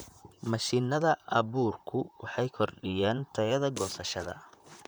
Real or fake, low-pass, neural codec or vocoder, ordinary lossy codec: fake; none; vocoder, 44.1 kHz, 128 mel bands, Pupu-Vocoder; none